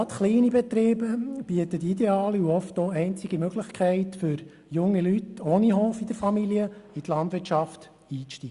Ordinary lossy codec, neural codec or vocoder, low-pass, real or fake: Opus, 64 kbps; none; 10.8 kHz; real